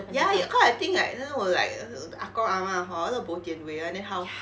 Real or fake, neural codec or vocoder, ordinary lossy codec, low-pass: real; none; none; none